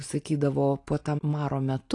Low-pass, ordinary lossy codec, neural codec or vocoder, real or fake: 10.8 kHz; AAC, 48 kbps; none; real